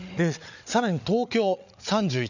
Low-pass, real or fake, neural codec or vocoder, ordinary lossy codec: 7.2 kHz; fake; codec, 16 kHz, 8 kbps, FreqCodec, larger model; none